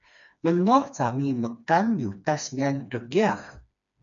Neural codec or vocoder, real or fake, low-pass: codec, 16 kHz, 2 kbps, FreqCodec, smaller model; fake; 7.2 kHz